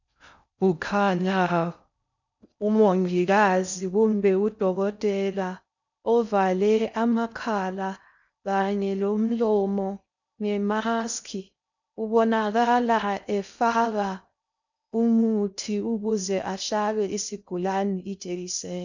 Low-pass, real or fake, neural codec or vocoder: 7.2 kHz; fake; codec, 16 kHz in and 24 kHz out, 0.6 kbps, FocalCodec, streaming, 4096 codes